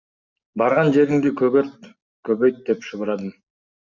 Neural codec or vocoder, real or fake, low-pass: none; real; 7.2 kHz